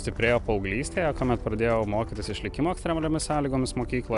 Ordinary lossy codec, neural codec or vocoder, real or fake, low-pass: AAC, 96 kbps; none; real; 10.8 kHz